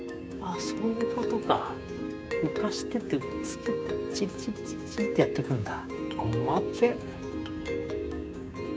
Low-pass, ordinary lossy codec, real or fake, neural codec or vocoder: none; none; fake; codec, 16 kHz, 6 kbps, DAC